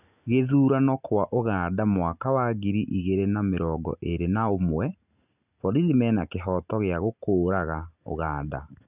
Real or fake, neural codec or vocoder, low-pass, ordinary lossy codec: real; none; 3.6 kHz; none